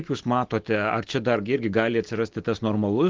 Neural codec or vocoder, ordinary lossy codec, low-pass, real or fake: none; Opus, 16 kbps; 7.2 kHz; real